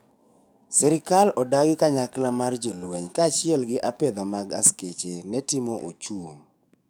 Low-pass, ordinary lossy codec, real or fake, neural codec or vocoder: none; none; fake; codec, 44.1 kHz, 7.8 kbps, DAC